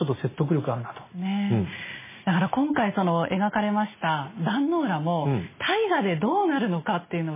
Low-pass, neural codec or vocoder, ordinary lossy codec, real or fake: 3.6 kHz; none; MP3, 16 kbps; real